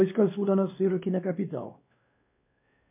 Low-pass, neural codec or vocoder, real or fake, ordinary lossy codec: 3.6 kHz; codec, 16 kHz, 2 kbps, X-Codec, WavLM features, trained on Multilingual LibriSpeech; fake; MP3, 24 kbps